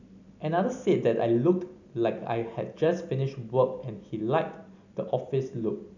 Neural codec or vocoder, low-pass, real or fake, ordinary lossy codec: none; 7.2 kHz; real; none